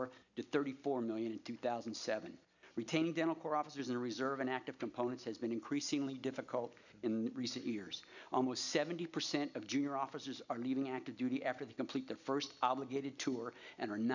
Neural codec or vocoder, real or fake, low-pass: none; real; 7.2 kHz